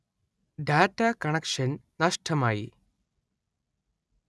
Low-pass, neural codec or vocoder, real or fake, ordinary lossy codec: none; none; real; none